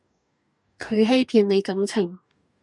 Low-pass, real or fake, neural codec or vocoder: 10.8 kHz; fake; codec, 44.1 kHz, 2.6 kbps, DAC